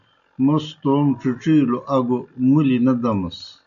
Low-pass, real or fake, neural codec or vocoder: 7.2 kHz; real; none